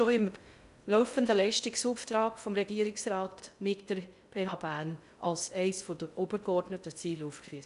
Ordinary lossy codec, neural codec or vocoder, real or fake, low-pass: none; codec, 16 kHz in and 24 kHz out, 0.6 kbps, FocalCodec, streaming, 2048 codes; fake; 10.8 kHz